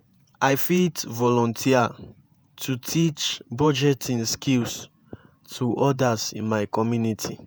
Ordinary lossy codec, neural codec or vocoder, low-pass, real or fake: none; vocoder, 48 kHz, 128 mel bands, Vocos; none; fake